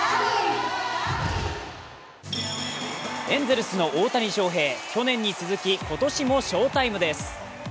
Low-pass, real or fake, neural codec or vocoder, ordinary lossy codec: none; real; none; none